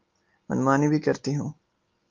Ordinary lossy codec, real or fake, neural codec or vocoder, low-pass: Opus, 24 kbps; real; none; 7.2 kHz